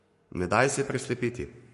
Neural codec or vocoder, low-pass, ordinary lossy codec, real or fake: codec, 44.1 kHz, 7.8 kbps, Pupu-Codec; 14.4 kHz; MP3, 48 kbps; fake